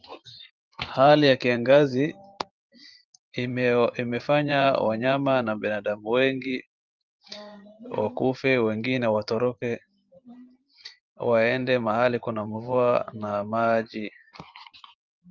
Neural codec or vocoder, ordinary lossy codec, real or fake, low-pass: vocoder, 44.1 kHz, 128 mel bands every 512 samples, BigVGAN v2; Opus, 32 kbps; fake; 7.2 kHz